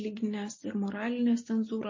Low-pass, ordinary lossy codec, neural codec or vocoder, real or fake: 7.2 kHz; MP3, 32 kbps; none; real